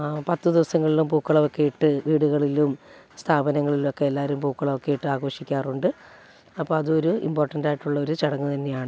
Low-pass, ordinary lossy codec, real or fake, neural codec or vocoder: none; none; real; none